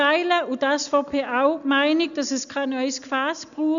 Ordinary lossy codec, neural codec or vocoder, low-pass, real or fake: none; none; 7.2 kHz; real